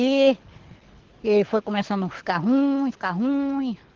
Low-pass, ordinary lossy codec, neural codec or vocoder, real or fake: 7.2 kHz; Opus, 16 kbps; codec, 24 kHz, 6 kbps, HILCodec; fake